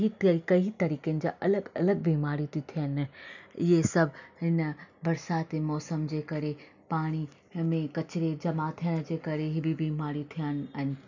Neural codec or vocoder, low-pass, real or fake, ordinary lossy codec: none; 7.2 kHz; real; none